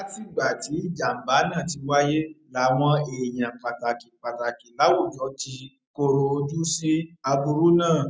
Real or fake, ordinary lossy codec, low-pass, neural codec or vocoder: real; none; none; none